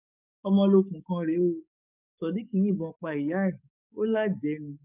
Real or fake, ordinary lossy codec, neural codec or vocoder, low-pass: fake; none; codec, 44.1 kHz, 7.8 kbps, DAC; 3.6 kHz